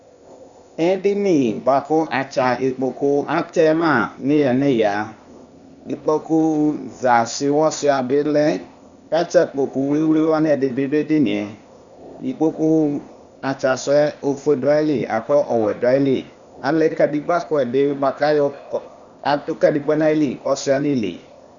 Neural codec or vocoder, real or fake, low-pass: codec, 16 kHz, 0.8 kbps, ZipCodec; fake; 7.2 kHz